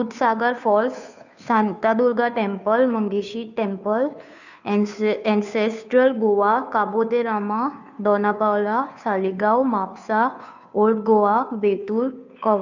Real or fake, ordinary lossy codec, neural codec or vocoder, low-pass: fake; none; codec, 16 kHz, 2 kbps, FunCodec, trained on Chinese and English, 25 frames a second; 7.2 kHz